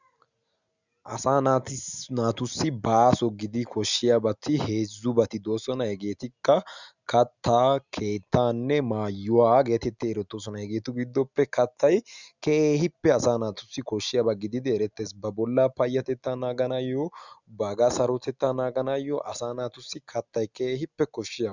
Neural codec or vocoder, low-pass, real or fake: none; 7.2 kHz; real